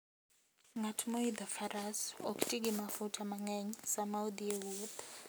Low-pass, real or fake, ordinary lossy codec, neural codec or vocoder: none; real; none; none